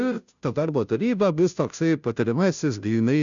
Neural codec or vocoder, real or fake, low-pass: codec, 16 kHz, 0.5 kbps, FunCodec, trained on Chinese and English, 25 frames a second; fake; 7.2 kHz